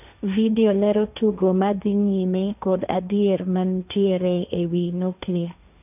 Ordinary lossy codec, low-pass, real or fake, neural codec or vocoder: none; 3.6 kHz; fake; codec, 16 kHz, 1.1 kbps, Voila-Tokenizer